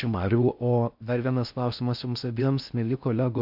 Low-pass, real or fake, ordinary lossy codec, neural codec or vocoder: 5.4 kHz; fake; AAC, 48 kbps; codec, 16 kHz in and 24 kHz out, 0.6 kbps, FocalCodec, streaming, 4096 codes